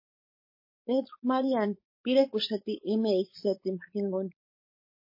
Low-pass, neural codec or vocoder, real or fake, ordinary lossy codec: 5.4 kHz; codec, 16 kHz, 4.8 kbps, FACodec; fake; MP3, 24 kbps